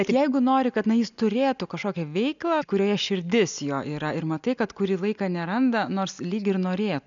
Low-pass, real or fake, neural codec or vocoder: 7.2 kHz; real; none